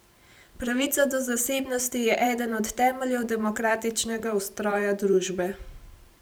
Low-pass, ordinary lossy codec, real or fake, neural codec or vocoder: none; none; fake; vocoder, 44.1 kHz, 128 mel bands every 512 samples, BigVGAN v2